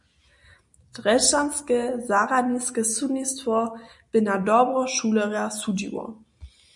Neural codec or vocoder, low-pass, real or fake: none; 10.8 kHz; real